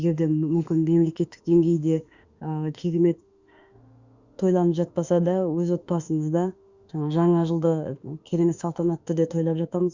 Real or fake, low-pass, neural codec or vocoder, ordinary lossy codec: fake; 7.2 kHz; autoencoder, 48 kHz, 32 numbers a frame, DAC-VAE, trained on Japanese speech; Opus, 64 kbps